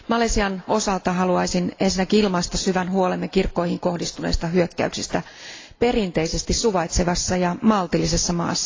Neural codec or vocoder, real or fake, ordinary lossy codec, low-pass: none; real; AAC, 32 kbps; 7.2 kHz